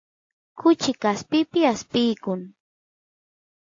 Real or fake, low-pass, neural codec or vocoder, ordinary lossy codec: real; 7.2 kHz; none; AAC, 48 kbps